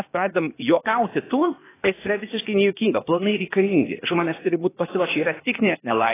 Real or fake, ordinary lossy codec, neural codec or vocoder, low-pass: fake; AAC, 16 kbps; codec, 16 kHz, 0.8 kbps, ZipCodec; 3.6 kHz